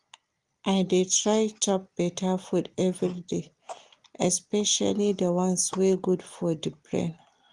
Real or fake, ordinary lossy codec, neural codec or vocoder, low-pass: real; Opus, 24 kbps; none; 10.8 kHz